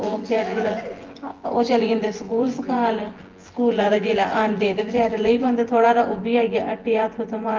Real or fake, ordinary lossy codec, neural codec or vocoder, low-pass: fake; Opus, 16 kbps; vocoder, 24 kHz, 100 mel bands, Vocos; 7.2 kHz